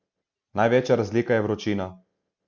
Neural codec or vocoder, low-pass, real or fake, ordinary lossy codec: none; 7.2 kHz; real; Opus, 64 kbps